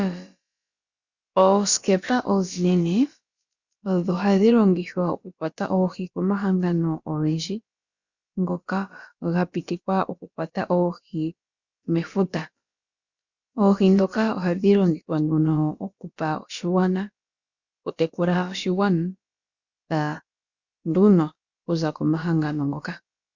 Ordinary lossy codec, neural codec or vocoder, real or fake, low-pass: Opus, 64 kbps; codec, 16 kHz, about 1 kbps, DyCAST, with the encoder's durations; fake; 7.2 kHz